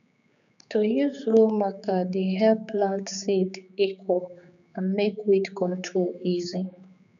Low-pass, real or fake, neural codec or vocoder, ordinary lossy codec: 7.2 kHz; fake; codec, 16 kHz, 4 kbps, X-Codec, HuBERT features, trained on general audio; none